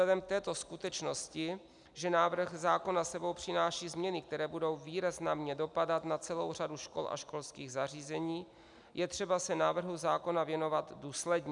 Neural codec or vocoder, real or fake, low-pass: none; real; 10.8 kHz